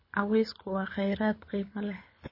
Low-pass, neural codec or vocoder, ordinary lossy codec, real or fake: 5.4 kHz; codec, 24 kHz, 6 kbps, HILCodec; MP3, 24 kbps; fake